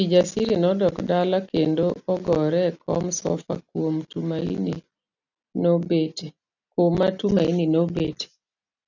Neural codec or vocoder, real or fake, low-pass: none; real; 7.2 kHz